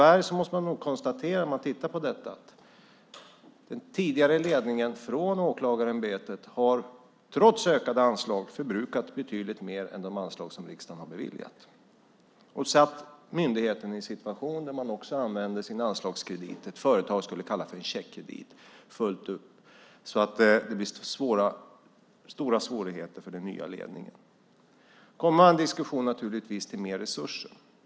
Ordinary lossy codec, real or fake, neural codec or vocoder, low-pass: none; real; none; none